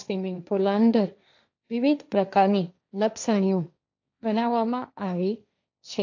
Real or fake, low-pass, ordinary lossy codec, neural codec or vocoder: fake; 7.2 kHz; AAC, 48 kbps; codec, 16 kHz, 1.1 kbps, Voila-Tokenizer